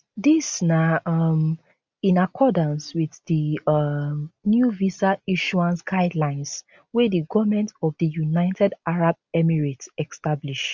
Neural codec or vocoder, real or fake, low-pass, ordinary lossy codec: none; real; none; none